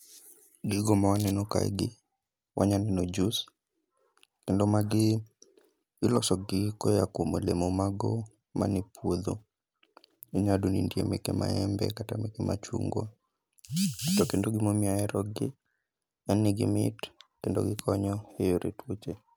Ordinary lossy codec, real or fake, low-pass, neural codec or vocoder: none; real; none; none